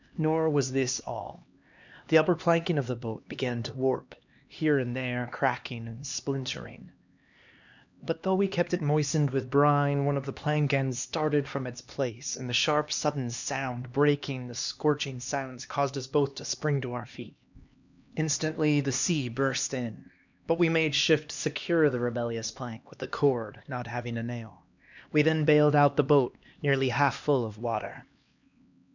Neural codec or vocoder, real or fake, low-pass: codec, 16 kHz, 2 kbps, X-Codec, HuBERT features, trained on LibriSpeech; fake; 7.2 kHz